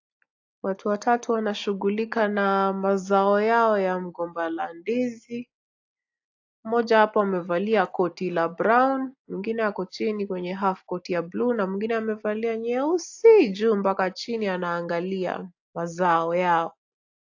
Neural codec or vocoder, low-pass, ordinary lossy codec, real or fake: none; 7.2 kHz; AAC, 48 kbps; real